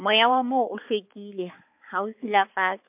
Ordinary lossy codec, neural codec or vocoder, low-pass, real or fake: MP3, 32 kbps; codec, 16 kHz, 4 kbps, X-Codec, HuBERT features, trained on balanced general audio; 3.6 kHz; fake